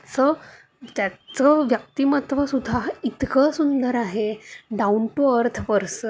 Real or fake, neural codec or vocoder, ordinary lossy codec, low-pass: real; none; none; none